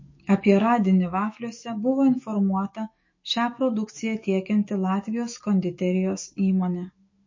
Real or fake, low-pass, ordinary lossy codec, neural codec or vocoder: fake; 7.2 kHz; MP3, 32 kbps; autoencoder, 48 kHz, 128 numbers a frame, DAC-VAE, trained on Japanese speech